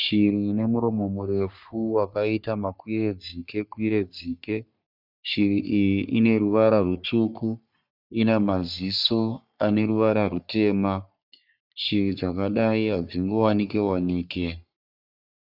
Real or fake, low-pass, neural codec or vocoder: fake; 5.4 kHz; codec, 44.1 kHz, 3.4 kbps, Pupu-Codec